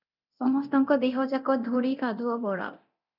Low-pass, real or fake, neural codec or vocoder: 5.4 kHz; fake; codec, 24 kHz, 0.9 kbps, DualCodec